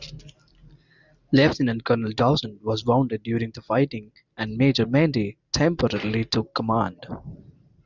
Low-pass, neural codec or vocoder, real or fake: 7.2 kHz; none; real